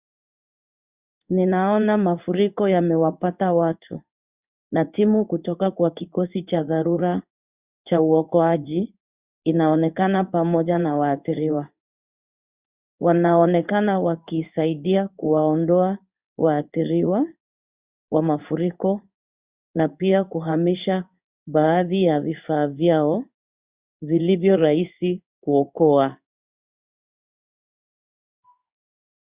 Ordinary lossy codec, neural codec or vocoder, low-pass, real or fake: Opus, 64 kbps; codec, 16 kHz in and 24 kHz out, 1 kbps, XY-Tokenizer; 3.6 kHz; fake